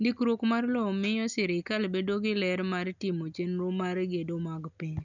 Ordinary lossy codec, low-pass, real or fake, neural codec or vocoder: none; 7.2 kHz; real; none